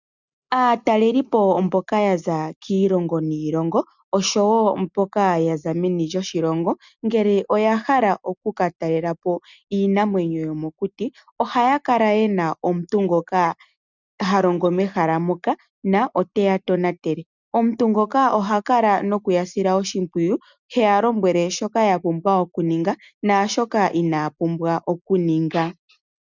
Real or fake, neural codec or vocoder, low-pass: real; none; 7.2 kHz